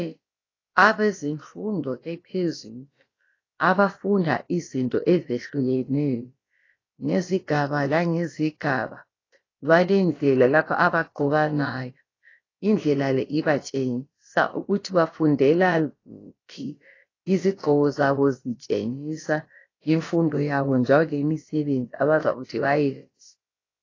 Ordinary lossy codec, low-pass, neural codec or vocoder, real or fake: AAC, 32 kbps; 7.2 kHz; codec, 16 kHz, about 1 kbps, DyCAST, with the encoder's durations; fake